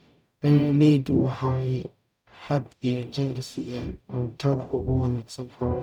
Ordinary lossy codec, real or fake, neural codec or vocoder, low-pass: none; fake; codec, 44.1 kHz, 0.9 kbps, DAC; 19.8 kHz